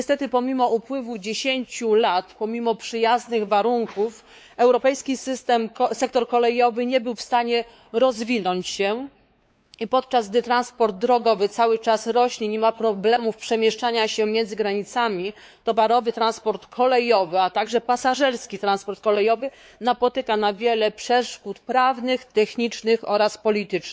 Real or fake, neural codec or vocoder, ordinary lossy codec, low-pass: fake; codec, 16 kHz, 4 kbps, X-Codec, WavLM features, trained on Multilingual LibriSpeech; none; none